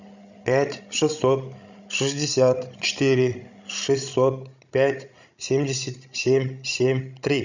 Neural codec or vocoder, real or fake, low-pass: codec, 16 kHz, 16 kbps, FreqCodec, larger model; fake; 7.2 kHz